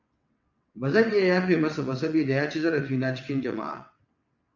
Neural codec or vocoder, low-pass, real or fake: vocoder, 22.05 kHz, 80 mel bands, WaveNeXt; 7.2 kHz; fake